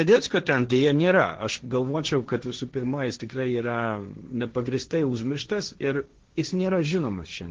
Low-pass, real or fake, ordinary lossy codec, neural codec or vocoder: 7.2 kHz; fake; Opus, 16 kbps; codec, 16 kHz, 1.1 kbps, Voila-Tokenizer